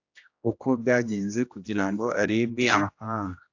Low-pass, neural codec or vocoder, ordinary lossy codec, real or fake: 7.2 kHz; codec, 16 kHz, 1 kbps, X-Codec, HuBERT features, trained on general audio; AAC, 48 kbps; fake